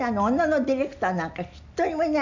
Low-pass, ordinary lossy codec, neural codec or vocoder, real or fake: 7.2 kHz; none; none; real